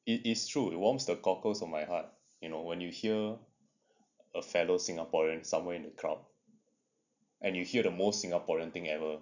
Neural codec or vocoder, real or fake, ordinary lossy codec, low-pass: none; real; none; 7.2 kHz